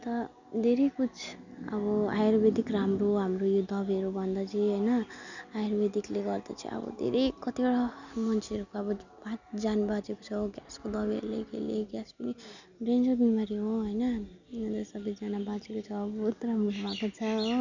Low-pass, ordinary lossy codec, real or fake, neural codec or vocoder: 7.2 kHz; none; real; none